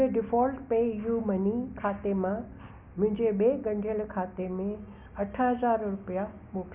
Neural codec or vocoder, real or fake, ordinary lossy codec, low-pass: none; real; none; 3.6 kHz